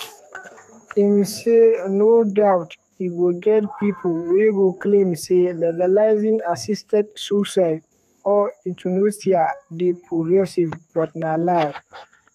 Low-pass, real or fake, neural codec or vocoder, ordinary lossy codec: 14.4 kHz; fake; codec, 32 kHz, 1.9 kbps, SNAC; none